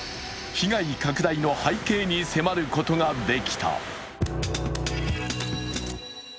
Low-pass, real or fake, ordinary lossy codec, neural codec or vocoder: none; real; none; none